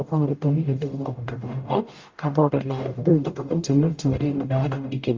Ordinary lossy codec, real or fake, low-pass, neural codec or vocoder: Opus, 32 kbps; fake; 7.2 kHz; codec, 44.1 kHz, 0.9 kbps, DAC